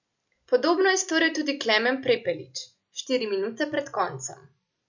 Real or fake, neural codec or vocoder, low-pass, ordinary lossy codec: real; none; 7.2 kHz; none